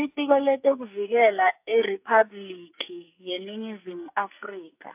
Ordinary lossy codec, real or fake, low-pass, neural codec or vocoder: AAC, 32 kbps; fake; 3.6 kHz; codec, 44.1 kHz, 2.6 kbps, SNAC